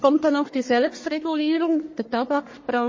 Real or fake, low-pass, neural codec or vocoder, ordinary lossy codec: fake; 7.2 kHz; codec, 44.1 kHz, 1.7 kbps, Pupu-Codec; MP3, 32 kbps